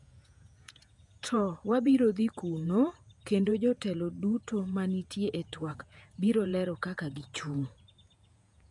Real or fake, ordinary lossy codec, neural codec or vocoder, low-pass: fake; none; vocoder, 48 kHz, 128 mel bands, Vocos; 10.8 kHz